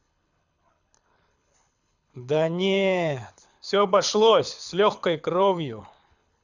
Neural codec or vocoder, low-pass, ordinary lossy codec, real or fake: codec, 24 kHz, 6 kbps, HILCodec; 7.2 kHz; none; fake